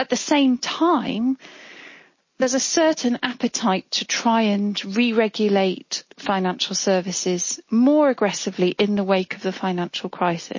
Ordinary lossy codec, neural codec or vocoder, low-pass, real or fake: MP3, 32 kbps; none; 7.2 kHz; real